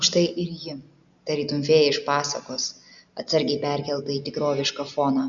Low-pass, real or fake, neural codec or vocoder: 7.2 kHz; real; none